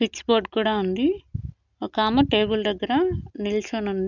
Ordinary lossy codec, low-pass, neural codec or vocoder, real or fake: none; 7.2 kHz; none; real